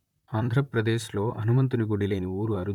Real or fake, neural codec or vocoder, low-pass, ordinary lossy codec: fake; vocoder, 44.1 kHz, 128 mel bands, Pupu-Vocoder; 19.8 kHz; none